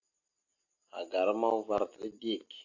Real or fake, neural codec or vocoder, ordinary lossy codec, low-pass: real; none; AAC, 48 kbps; 7.2 kHz